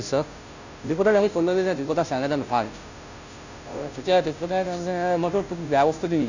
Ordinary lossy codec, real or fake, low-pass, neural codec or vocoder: none; fake; 7.2 kHz; codec, 16 kHz, 0.5 kbps, FunCodec, trained on Chinese and English, 25 frames a second